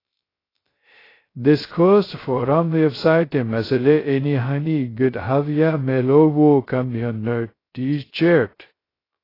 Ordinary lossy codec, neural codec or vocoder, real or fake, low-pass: AAC, 24 kbps; codec, 16 kHz, 0.2 kbps, FocalCodec; fake; 5.4 kHz